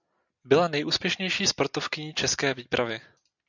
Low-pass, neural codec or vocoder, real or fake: 7.2 kHz; none; real